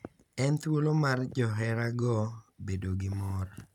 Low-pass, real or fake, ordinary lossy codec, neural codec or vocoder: 19.8 kHz; real; none; none